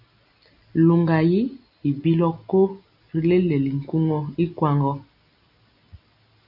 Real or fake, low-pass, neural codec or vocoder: real; 5.4 kHz; none